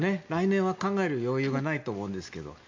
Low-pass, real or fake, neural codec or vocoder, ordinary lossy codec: 7.2 kHz; real; none; MP3, 48 kbps